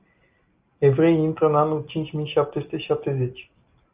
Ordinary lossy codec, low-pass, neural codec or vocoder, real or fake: Opus, 24 kbps; 3.6 kHz; none; real